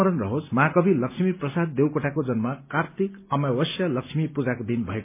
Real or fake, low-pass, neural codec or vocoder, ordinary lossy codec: real; 3.6 kHz; none; MP3, 24 kbps